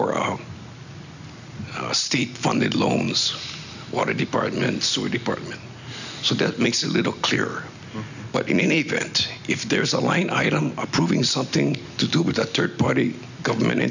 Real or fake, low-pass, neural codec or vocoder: real; 7.2 kHz; none